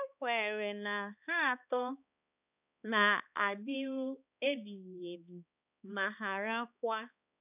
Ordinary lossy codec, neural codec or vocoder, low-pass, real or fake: none; codec, 16 kHz, 2 kbps, X-Codec, HuBERT features, trained on balanced general audio; 3.6 kHz; fake